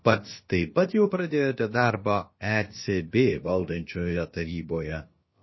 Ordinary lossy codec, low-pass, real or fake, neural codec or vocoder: MP3, 24 kbps; 7.2 kHz; fake; codec, 16 kHz, about 1 kbps, DyCAST, with the encoder's durations